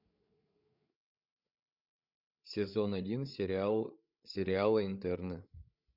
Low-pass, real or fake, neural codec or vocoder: 5.4 kHz; fake; codec, 16 kHz, 8 kbps, FreqCodec, larger model